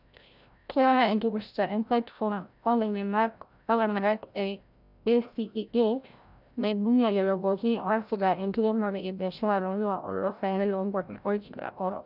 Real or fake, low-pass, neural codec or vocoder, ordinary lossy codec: fake; 5.4 kHz; codec, 16 kHz, 0.5 kbps, FreqCodec, larger model; none